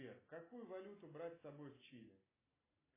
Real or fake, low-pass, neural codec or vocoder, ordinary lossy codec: real; 3.6 kHz; none; AAC, 16 kbps